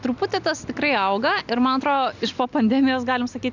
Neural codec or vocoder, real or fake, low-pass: none; real; 7.2 kHz